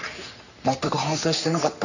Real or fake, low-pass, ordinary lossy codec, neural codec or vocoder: fake; 7.2 kHz; none; codec, 44.1 kHz, 3.4 kbps, Pupu-Codec